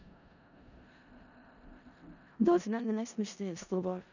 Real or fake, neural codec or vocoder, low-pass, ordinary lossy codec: fake; codec, 16 kHz in and 24 kHz out, 0.4 kbps, LongCat-Audio-Codec, four codebook decoder; 7.2 kHz; none